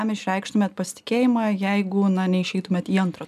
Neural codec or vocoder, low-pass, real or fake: none; 14.4 kHz; real